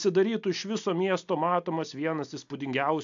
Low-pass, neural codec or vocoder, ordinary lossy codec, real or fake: 7.2 kHz; none; AAC, 64 kbps; real